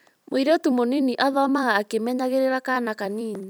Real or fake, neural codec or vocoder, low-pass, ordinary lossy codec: fake; vocoder, 44.1 kHz, 128 mel bands every 256 samples, BigVGAN v2; none; none